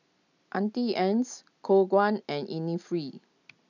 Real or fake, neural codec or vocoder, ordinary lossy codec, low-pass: real; none; none; 7.2 kHz